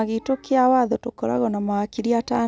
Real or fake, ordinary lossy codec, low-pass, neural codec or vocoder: real; none; none; none